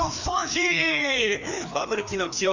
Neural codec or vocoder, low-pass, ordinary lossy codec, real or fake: codec, 16 kHz, 2 kbps, FreqCodec, larger model; 7.2 kHz; none; fake